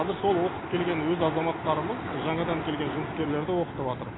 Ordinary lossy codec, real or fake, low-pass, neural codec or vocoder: AAC, 16 kbps; real; 7.2 kHz; none